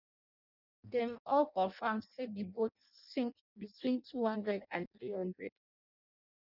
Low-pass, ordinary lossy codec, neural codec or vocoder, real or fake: 5.4 kHz; none; codec, 16 kHz in and 24 kHz out, 0.6 kbps, FireRedTTS-2 codec; fake